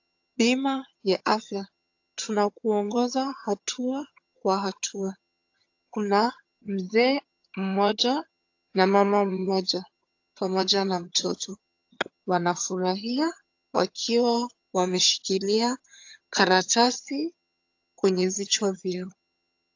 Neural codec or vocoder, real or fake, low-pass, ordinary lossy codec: vocoder, 22.05 kHz, 80 mel bands, HiFi-GAN; fake; 7.2 kHz; AAC, 48 kbps